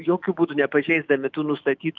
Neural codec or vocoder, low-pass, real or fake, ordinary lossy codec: autoencoder, 48 kHz, 128 numbers a frame, DAC-VAE, trained on Japanese speech; 7.2 kHz; fake; Opus, 24 kbps